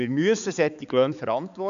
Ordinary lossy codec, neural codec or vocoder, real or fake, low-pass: none; codec, 16 kHz, 4 kbps, X-Codec, HuBERT features, trained on balanced general audio; fake; 7.2 kHz